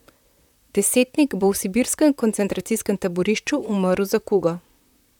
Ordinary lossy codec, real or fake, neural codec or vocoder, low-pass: none; fake; vocoder, 44.1 kHz, 128 mel bands, Pupu-Vocoder; 19.8 kHz